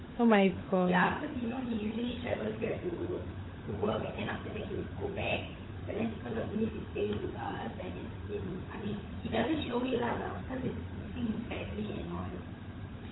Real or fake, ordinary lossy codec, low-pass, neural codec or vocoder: fake; AAC, 16 kbps; 7.2 kHz; codec, 16 kHz, 16 kbps, FunCodec, trained on LibriTTS, 50 frames a second